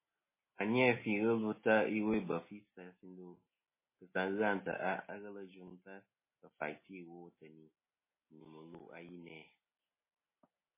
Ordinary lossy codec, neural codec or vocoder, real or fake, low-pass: MP3, 16 kbps; none; real; 3.6 kHz